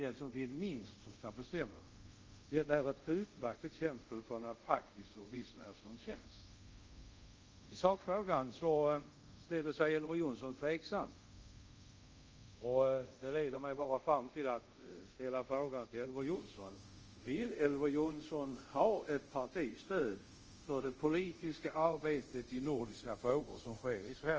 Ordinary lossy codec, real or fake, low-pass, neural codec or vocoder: Opus, 24 kbps; fake; 7.2 kHz; codec, 24 kHz, 0.5 kbps, DualCodec